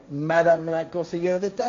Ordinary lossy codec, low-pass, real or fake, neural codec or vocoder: AAC, 48 kbps; 7.2 kHz; fake; codec, 16 kHz, 1.1 kbps, Voila-Tokenizer